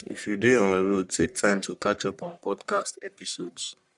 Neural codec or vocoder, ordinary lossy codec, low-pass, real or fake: codec, 44.1 kHz, 1.7 kbps, Pupu-Codec; none; 10.8 kHz; fake